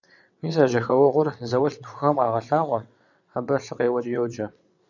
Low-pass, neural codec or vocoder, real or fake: 7.2 kHz; vocoder, 22.05 kHz, 80 mel bands, WaveNeXt; fake